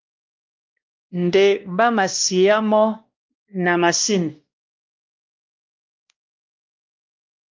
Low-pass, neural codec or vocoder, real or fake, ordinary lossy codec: 7.2 kHz; codec, 16 kHz, 1 kbps, X-Codec, WavLM features, trained on Multilingual LibriSpeech; fake; Opus, 32 kbps